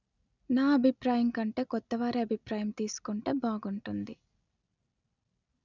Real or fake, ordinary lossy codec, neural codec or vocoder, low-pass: real; none; none; 7.2 kHz